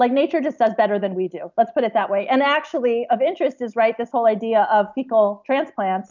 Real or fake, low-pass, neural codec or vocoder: real; 7.2 kHz; none